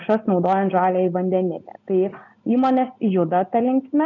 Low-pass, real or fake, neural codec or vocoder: 7.2 kHz; real; none